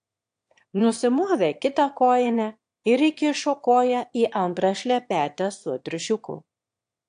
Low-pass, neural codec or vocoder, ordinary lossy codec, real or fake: 9.9 kHz; autoencoder, 22.05 kHz, a latent of 192 numbers a frame, VITS, trained on one speaker; AAC, 64 kbps; fake